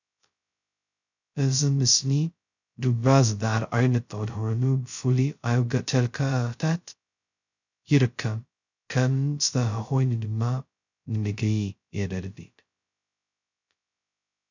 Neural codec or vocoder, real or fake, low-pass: codec, 16 kHz, 0.2 kbps, FocalCodec; fake; 7.2 kHz